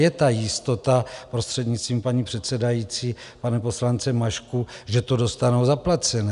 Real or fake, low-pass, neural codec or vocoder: real; 10.8 kHz; none